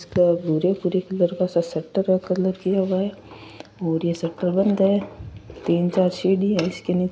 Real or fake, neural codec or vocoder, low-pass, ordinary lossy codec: real; none; none; none